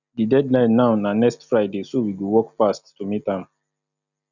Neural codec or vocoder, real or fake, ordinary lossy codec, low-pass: none; real; none; 7.2 kHz